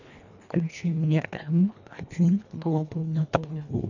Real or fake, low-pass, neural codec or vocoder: fake; 7.2 kHz; codec, 24 kHz, 1.5 kbps, HILCodec